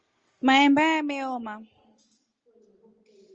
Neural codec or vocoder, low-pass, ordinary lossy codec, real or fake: none; 7.2 kHz; Opus, 24 kbps; real